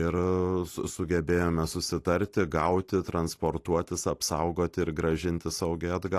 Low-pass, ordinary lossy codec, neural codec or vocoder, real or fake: 14.4 kHz; AAC, 48 kbps; none; real